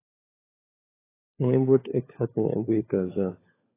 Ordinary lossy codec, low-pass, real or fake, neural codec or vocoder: AAC, 16 kbps; 3.6 kHz; fake; codec, 16 kHz, 4 kbps, FunCodec, trained on LibriTTS, 50 frames a second